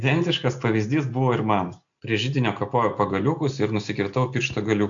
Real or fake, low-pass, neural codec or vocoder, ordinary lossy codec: real; 7.2 kHz; none; MP3, 64 kbps